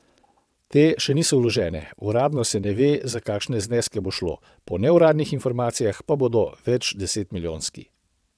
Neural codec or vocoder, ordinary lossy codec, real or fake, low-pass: vocoder, 22.05 kHz, 80 mel bands, WaveNeXt; none; fake; none